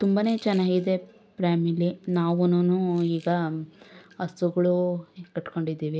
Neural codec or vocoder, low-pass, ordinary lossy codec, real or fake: none; none; none; real